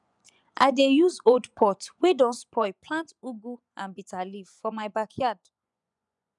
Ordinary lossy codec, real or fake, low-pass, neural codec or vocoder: none; fake; 10.8 kHz; vocoder, 24 kHz, 100 mel bands, Vocos